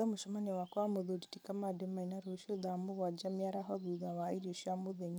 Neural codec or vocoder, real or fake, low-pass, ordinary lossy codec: vocoder, 44.1 kHz, 128 mel bands every 256 samples, BigVGAN v2; fake; none; none